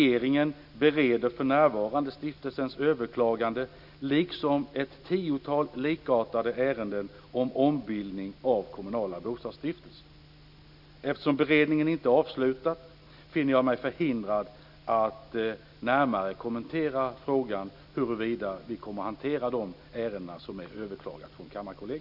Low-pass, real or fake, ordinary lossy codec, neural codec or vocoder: 5.4 kHz; real; none; none